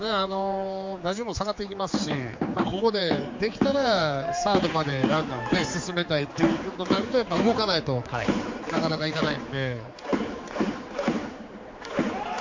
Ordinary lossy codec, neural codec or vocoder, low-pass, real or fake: MP3, 48 kbps; codec, 16 kHz, 4 kbps, X-Codec, HuBERT features, trained on general audio; 7.2 kHz; fake